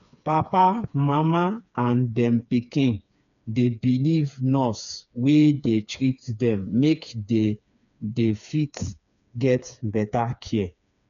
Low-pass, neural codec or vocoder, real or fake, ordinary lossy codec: 7.2 kHz; codec, 16 kHz, 4 kbps, FreqCodec, smaller model; fake; none